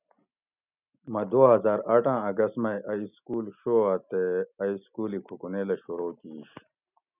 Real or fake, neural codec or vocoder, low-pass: real; none; 3.6 kHz